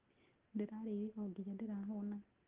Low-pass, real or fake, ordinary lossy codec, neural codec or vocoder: 3.6 kHz; real; Opus, 16 kbps; none